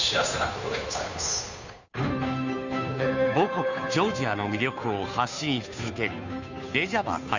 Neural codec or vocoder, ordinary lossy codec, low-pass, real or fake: codec, 16 kHz, 2 kbps, FunCodec, trained on Chinese and English, 25 frames a second; none; 7.2 kHz; fake